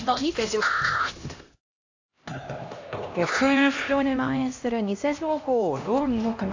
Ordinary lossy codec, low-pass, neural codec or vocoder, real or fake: AAC, 48 kbps; 7.2 kHz; codec, 16 kHz, 1 kbps, X-Codec, HuBERT features, trained on LibriSpeech; fake